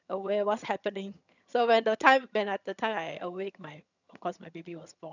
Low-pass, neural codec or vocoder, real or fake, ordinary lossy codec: 7.2 kHz; vocoder, 22.05 kHz, 80 mel bands, HiFi-GAN; fake; none